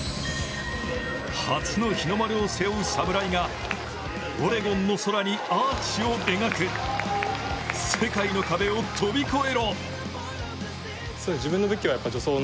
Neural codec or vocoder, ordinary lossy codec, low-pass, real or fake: none; none; none; real